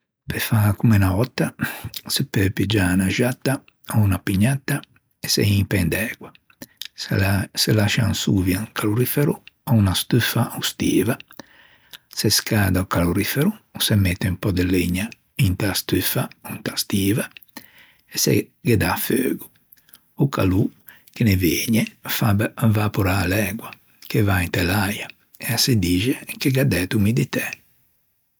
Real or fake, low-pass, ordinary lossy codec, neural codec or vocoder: real; none; none; none